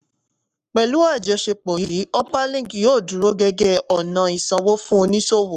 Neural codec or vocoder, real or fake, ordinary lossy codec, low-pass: codec, 44.1 kHz, 7.8 kbps, Pupu-Codec; fake; none; 14.4 kHz